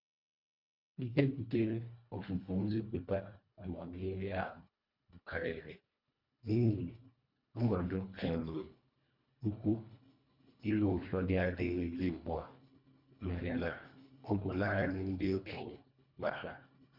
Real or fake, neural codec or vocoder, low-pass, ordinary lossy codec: fake; codec, 24 kHz, 1.5 kbps, HILCodec; 5.4 kHz; MP3, 48 kbps